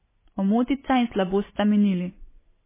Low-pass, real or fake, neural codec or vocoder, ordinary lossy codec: 3.6 kHz; real; none; MP3, 16 kbps